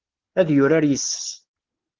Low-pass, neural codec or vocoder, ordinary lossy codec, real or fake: 7.2 kHz; none; Opus, 16 kbps; real